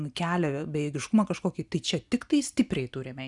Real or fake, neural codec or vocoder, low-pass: real; none; 10.8 kHz